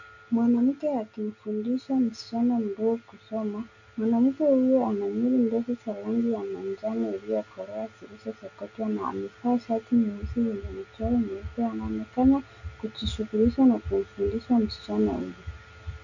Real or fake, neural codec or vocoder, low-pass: real; none; 7.2 kHz